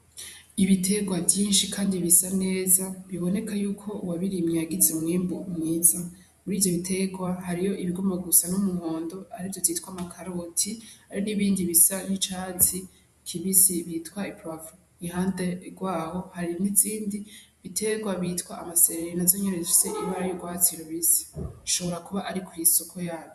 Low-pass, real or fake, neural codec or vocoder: 14.4 kHz; fake; vocoder, 48 kHz, 128 mel bands, Vocos